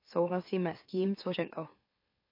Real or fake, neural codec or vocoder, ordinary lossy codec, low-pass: fake; autoencoder, 44.1 kHz, a latent of 192 numbers a frame, MeloTTS; MP3, 32 kbps; 5.4 kHz